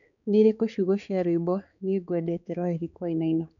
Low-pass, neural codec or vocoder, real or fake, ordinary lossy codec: 7.2 kHz; codec, 16 kHz, 4 kbps, X-Codec, HuBERT features, trained on balanced general audio; fake; none